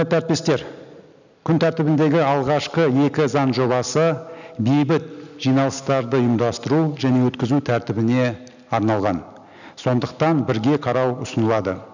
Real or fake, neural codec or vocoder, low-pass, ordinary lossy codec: real; none; 7.2 kHz; none